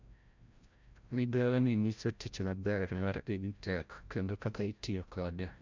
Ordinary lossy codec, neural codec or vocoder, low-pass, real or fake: MP3, 64 kbps; codec, 16 kHz, 0.5 kbps, FreqCodec, larger model; 7.2 kHz; fake